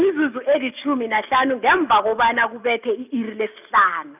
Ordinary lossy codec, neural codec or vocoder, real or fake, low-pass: none; none; real; 3.6 kHz